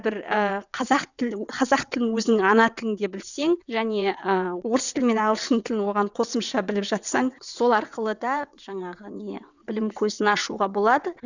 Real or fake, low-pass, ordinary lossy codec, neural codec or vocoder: fake; 7.2 kHz; none; vocoder, 22.05 kHz, 80 mel bands, WaveNeXt